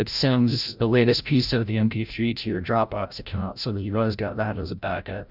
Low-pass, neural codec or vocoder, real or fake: 5.4 kHz; codec, 16 kHz, 0.5 kbps, FreqCodec, larger model; fake